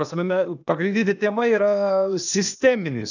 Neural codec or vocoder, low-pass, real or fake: codec, 16 kHz, 0.8 kbps, ZipCodec; 7.2 kHz; fake